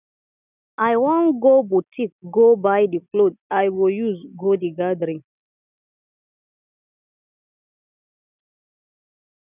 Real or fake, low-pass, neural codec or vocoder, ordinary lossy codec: real; 3.6 kHz; none; none